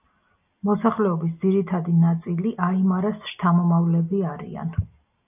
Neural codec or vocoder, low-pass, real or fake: none; 3.6 kHz; real